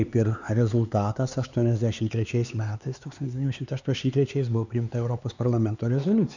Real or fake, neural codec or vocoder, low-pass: fake; codec, 16 kHz, 4 kbps, X-Codec, HuBERT features, trained on LibriSpeech; 7.2 kHz